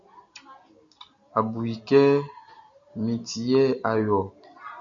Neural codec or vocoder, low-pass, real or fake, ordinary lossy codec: none; 7.2 kHz; real; MP3, 64 kbps